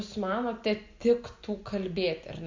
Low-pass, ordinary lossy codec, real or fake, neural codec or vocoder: 7.2 kHz; MP3, 48 kbps; real; none